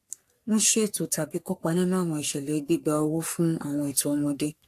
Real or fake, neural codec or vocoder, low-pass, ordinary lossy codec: fake; codec, 44.1 kHz, 3.4 kbps, Pupu-Codec; 14.4 kHz; AAC, 64 kbps